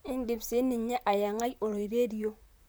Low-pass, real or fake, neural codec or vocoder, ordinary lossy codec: none; fake; vocoder, 44.1 kHz, 128 mel bands, Pupu-Vocoder; none